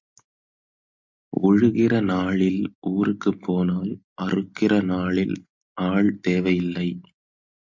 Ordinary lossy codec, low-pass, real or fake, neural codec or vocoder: MP3, 48 kbps; 7.2 kHz; real; none